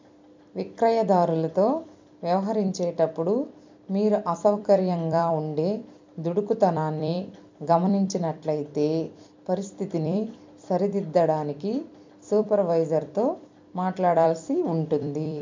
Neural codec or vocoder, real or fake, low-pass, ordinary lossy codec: vocoder, 44.1 kHz, 128 mel bands every 256 samples, BigVGAN v2; fake; 7.2 kHz; MP3, 64 kbps